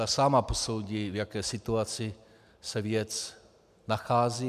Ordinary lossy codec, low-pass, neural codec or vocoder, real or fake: MP3, 96 kbps; 14.4 kHz; none; real